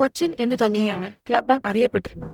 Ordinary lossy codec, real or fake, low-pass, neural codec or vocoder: none; fake; 19.8 kHz; codec, 44.1 kHz, 0.9 kbps, DAC